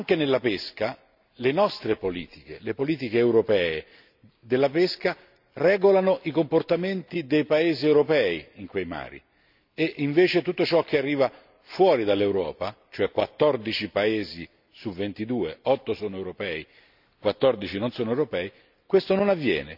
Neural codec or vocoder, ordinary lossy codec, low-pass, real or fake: none; none; 5.4 kHz; real